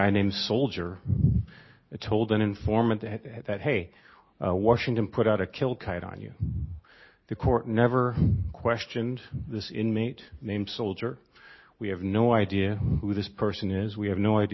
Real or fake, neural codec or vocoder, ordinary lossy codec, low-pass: real; none; MP3, 24 kbps; 7.2 kHz